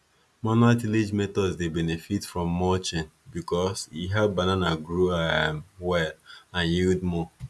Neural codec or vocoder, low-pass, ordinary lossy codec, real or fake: none; none; none; real